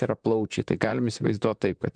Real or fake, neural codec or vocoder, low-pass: fake; vocoder, 44.1 kHz, 128 mel bands, Pupu-Vocoder; 9.9 kHz